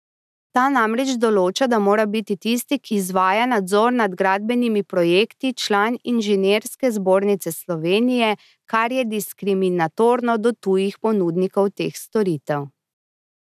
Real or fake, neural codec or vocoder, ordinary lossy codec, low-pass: real; none; none; 14.4 kHz